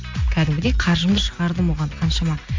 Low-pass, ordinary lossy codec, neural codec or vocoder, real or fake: 7.2 kHz; MP3, 48 kbps; none; real